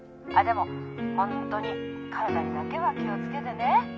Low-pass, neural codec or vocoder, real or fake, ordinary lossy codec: none; none; real; none